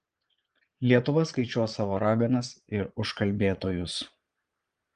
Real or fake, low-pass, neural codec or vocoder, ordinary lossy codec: fake; 9.9 kHz; vocoder, 22.05 kHz, 80 mel bands, Vocos; Opus, 24 kbps